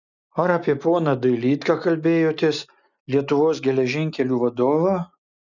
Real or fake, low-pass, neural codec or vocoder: real; 7.2 kHz; none